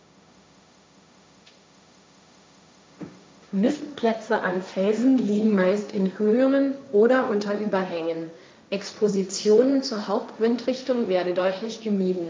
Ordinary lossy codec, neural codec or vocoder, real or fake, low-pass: none; codec, 16 kHz, 1.1 kbps, Voila-Tokenizer; fake; none